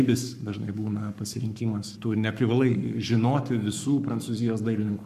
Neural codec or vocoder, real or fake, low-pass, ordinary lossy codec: codec, 44.1 kHz, 7.8 kbps, Pupu-Codec; fake; 14.4 kHz; MP3, 96 kbps